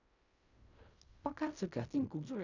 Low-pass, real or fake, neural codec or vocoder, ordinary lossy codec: 7.2 kHz; fake; codec, 16 kHz in and 24 kHz out, 0.4 kbps, LongCat-Audio-Codec, fine tuned four codebook decoder; none